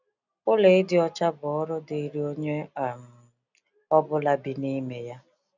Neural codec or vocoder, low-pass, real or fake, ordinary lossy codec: none; 7.2 kHz; real; none